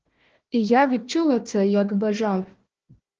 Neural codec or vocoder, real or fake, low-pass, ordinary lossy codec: codec, 16 kHz, 1 kbps, FunCodec, trained on Chinese and English, 50 frames a second; fake; 7.2 kHz; Opus, 16 kbps